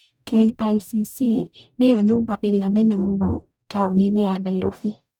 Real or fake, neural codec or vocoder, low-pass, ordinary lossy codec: fake; codec, 44.1 kHz, 0.9 kbps, DAC; 19.8 kHz; none